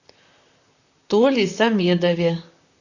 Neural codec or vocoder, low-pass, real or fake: vocoder, 44.1 kHz, 128 mel bands, Pupu-Vocoder; 7.2 kHz; fake